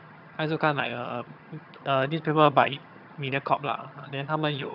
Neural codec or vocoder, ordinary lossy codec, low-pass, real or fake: vocoder, 22.05 kHz, 80 mel bands, HiFi-GAN; none; 5.4 kHz; fake